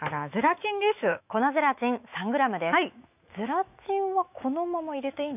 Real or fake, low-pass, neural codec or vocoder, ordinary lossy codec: fake; 3.6 kHz; autoencoder, 48 kHz, 128 numbers a frame, DAC-VAE, trained on Japanese speech; none